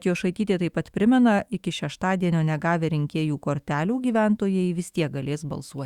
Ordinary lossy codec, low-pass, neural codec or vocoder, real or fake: Opus, 64 kbps; 19.8 kHz; autoencoder, 48 kHz, 128 numbers a frame, DAC-VAE, trained on Japanese speech; fake